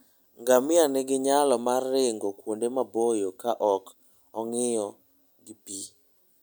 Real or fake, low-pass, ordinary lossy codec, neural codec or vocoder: real; none; none; none